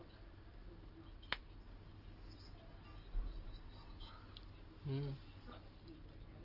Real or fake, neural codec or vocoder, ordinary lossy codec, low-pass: real; none; Opus, 24 kbps; 5.4 kHz